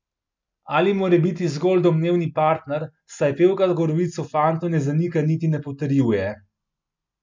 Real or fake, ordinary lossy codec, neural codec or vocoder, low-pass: real; MP3, 64 kbps; none; 7.2 kHz